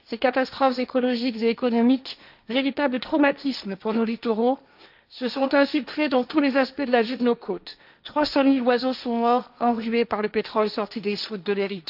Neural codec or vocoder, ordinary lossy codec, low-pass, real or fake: codec, 16 kHz, 1.1 kbps, Voila-Tokenizer; none; 5.4 kHz; fake